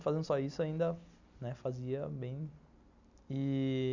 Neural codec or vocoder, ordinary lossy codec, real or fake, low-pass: none; none; real; 7.2 kHz